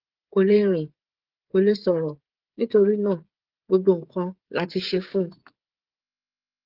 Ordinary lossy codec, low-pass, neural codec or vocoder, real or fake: Opus, 16 kbps; 5.4 kHz; codec, 16 kHz, 16 kbps, FreqCodec, smaller model; fake